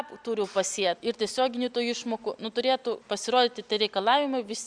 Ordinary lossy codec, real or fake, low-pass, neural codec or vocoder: Opus, 64 kbps; real; 9.9 kHz; none